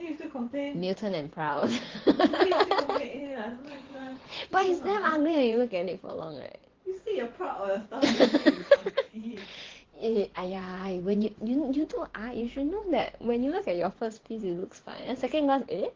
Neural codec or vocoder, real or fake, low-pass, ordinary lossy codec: vocoder, 24 kHz, 100 mel bands, Vocos; fake; 7.2 kHz; Opus, 16 kbps